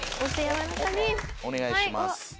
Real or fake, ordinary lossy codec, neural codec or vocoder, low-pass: real; none; none; none